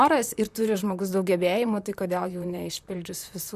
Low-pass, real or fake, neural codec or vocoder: 14.4 kHz; fake; vocoder, 44.1 kHz, 128 mel bands, Pupu-Vocoder